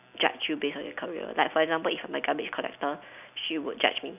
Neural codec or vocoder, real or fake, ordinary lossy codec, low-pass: none; real; none; 3.6 kHz